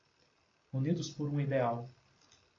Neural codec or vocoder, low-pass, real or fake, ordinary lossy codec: none; 7.2 kHz; real; AAC, 32 kbps